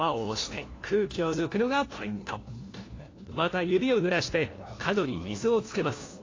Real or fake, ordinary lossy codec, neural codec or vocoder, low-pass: fake; AAC, 32 kbps; codec, 16 kHz, 1 kbps, FunCodec, trained on LibriTTS, 50 frames a second; 7.2 kHz